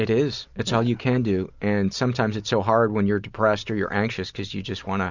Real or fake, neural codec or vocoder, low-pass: real; none; 7.2 kHz